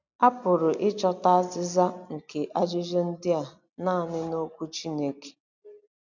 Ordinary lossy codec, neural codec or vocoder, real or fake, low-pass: none; none; real; 7.2 kHz